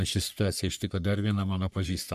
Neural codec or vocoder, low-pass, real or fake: codec, 44.1 kHz, 3.4 kbps, Pupu-Codec; 14.4 kHz; fake